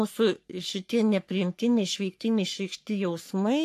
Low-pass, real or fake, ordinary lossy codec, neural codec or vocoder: 14.4 kHz; fake; AAC, 64 kbps; codec, 44.1 kHz, 3.4 kbps, Pupu-Codec